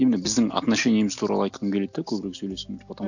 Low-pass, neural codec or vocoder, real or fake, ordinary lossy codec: 7.2 kHz; none; real; none